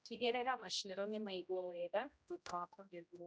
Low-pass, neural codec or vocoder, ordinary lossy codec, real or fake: none; codec, 16 kHz, 0.5 kbps, X-Codec, HuBERT features, trained on general audio; none; fake